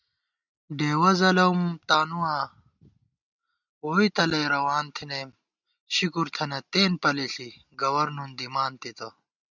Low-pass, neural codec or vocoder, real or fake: 7.2 kHz; none; real